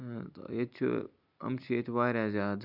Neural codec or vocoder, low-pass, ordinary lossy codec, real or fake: none; 5.4 kHz; none; real